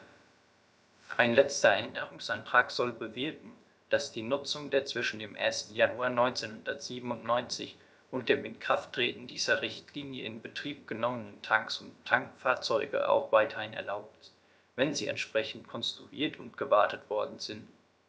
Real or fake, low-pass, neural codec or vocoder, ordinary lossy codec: fake; none; codec, 16 kHz, about 1 kbps, DyCAST, with the encoder's durations; none